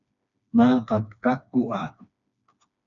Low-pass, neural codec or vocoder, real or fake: 7.2 kHz; codec, 16 kHz, 2 kbps, FreqCodec, smaller model; fake